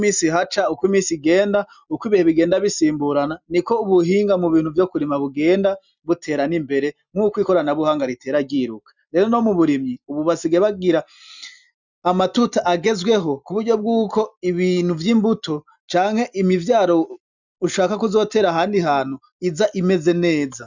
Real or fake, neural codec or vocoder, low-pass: real; none; 7.2 kHz